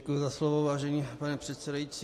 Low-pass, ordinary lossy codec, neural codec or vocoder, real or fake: 14.4 kHz; AAC, 48 kbps; none; real